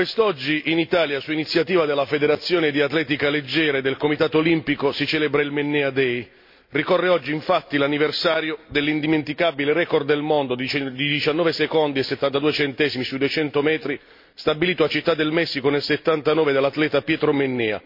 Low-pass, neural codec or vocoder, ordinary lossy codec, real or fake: 5.4 kHz; none; MP3, 32 kbps; real